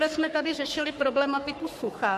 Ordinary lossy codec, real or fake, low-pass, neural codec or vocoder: MP3, 64 kbps; fake; 14.4 kHz; codec, 44.1 kHz, 3.4 kbps, Pupu-Codec